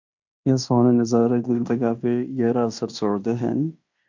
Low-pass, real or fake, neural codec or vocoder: 7.2 kHz; fake; codec, 16 kHz in and 24 kHz out, 0.9 kbps, LongCat-Audio-Codec, fine tuned four codebook decoder